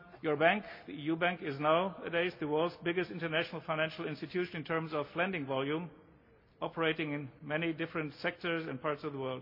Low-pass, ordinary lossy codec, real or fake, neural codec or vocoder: 5.4 kHz; MP3, 32 kbps; real; none